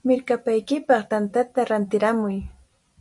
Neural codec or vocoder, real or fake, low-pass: none; real; 10.8 kHz